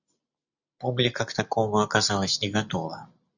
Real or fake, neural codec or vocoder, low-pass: real; none; 7.2 kHz